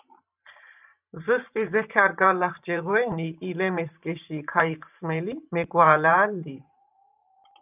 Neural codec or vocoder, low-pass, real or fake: vocoder, 44.1 kHz, 128 mel bands every 512 samples, BigVGAN v2; 3.6 kHz; fake